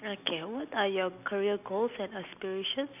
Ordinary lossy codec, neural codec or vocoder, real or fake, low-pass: none; none; real; 3.6 kHz